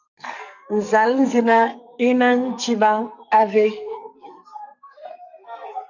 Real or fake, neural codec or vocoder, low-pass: fake; codec, 44.1 kHz, 2.6 kbps, SNAC; 7.2 kHz